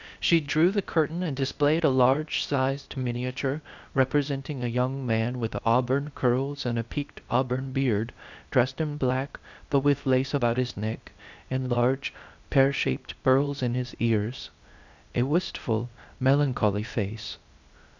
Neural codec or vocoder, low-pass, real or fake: codec, 16 kHz, 0.8 kbps, ZipCodec; 7.2 kHz; fake